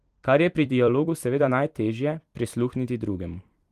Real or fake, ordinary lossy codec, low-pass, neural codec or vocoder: fake; Opus, 24 kbps; 14.4 kHz; vocoder, 44.1 kHz, 128 mel bands every 256 samples, BigVGAN v2